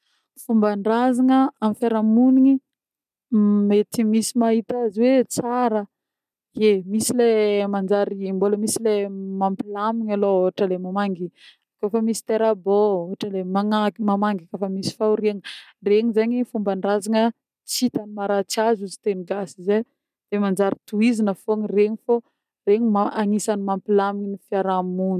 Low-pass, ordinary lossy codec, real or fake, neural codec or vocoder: 14.4 kHz; none; real; none